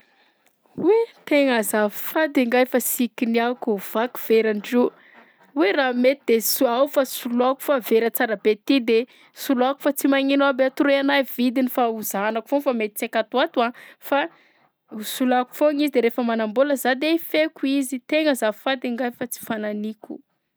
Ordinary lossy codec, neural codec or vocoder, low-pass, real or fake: none; none; none; real